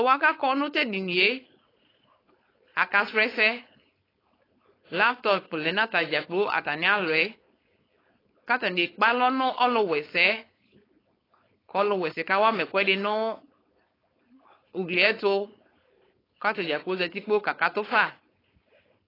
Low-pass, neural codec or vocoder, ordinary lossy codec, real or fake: 5.4 kHz; codec, 16 kHz, 4.8 kbps, FACodec; AAC, 24 kbps; fake